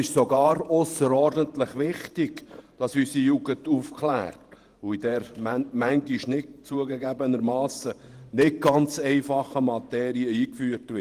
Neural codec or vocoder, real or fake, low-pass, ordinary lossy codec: vocoder, 48 kHz, 128 mel bands, Vocos; fake; 14.4 kHz; Opus, 24 kbps